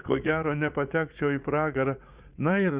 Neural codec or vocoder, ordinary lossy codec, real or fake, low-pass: vocoder, 44.1 kHz, 80 mel bands, Vocos; Opus, 64 kbps; fake; 3.6 kHz